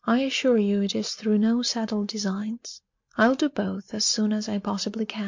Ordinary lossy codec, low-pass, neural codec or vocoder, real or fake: MP3, 48 kbps; 7.2 kHz; none; real